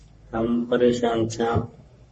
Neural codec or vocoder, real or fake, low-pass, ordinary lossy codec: codec, 44.1 kHz, 3.4 kbps, Pupu-Codec; fake; 10.8 kHz; MP3, 32 kbps